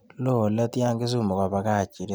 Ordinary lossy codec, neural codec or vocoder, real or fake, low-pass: none; none; real; none